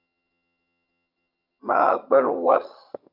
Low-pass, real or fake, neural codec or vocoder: 5.4 kHz; fake; vocoder, 22.05 kHz, 80 mel bands, HiFi-GAN